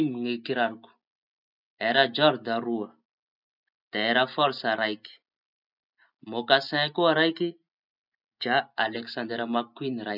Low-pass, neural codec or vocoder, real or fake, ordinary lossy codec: 5.4 kHz; none; real; none